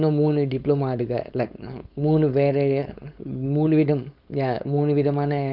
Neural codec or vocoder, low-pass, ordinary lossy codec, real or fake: codec, 16 kHz, 4.8 kbps, FACodec; 5.4 kHz; none; fake